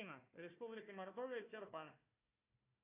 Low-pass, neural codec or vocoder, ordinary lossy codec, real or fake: 3.6 kHz; codec, 16 kHz, 1 kbps, FunCodec, trained on Chinese and English, 50 frames a second; AAC, 24 kbps; fake